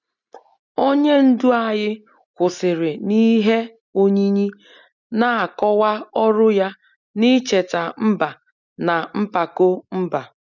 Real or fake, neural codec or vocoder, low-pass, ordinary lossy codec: real; none; 7.2 kHz; none